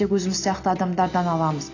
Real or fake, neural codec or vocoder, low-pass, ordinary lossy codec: real; none; 7.2 kHz; AAC, 32 kbps